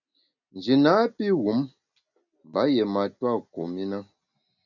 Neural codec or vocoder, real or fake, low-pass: none; real; 7.2 kHz